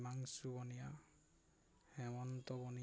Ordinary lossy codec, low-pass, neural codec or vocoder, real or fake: none; none; none; real